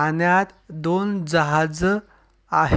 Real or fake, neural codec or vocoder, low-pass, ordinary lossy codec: real; none; none; none